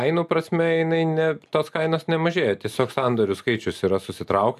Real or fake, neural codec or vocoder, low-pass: real; none; 14.4 kHz